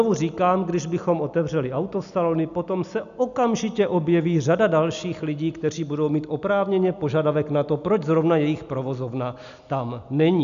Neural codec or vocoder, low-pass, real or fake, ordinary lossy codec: none; 7.2 kHz; real; AAC, 96 kbps